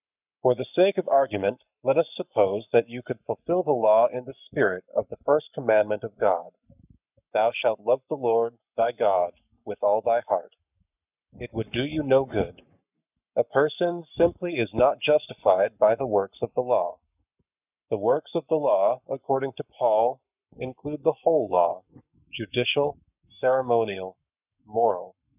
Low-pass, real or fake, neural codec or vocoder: 3.6 kHz; fake; codec, 44.1 kHz, 7.8 kbps, Pupu-Codec